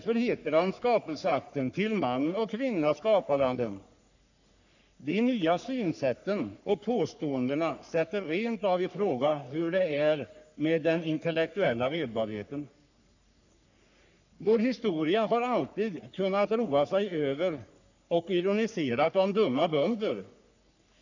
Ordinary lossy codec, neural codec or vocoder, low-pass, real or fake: none; codec, 44.1 kHz, 3.4 kbps, Pupu-Codec; 7.2 kHz; fake